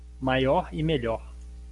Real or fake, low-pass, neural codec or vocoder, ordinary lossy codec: real; 10.8 kHz; none; Opus, 64 kbps